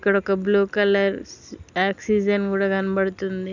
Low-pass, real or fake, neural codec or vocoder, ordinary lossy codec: 7.2 kHz; real; none; none